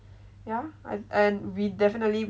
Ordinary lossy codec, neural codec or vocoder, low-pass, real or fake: none; none; none; real